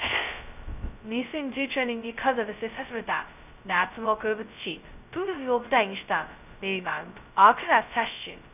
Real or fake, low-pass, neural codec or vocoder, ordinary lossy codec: fake; 3.6 kHz; codec, 16 kHz, 0.2 kbps, FocalCodec; none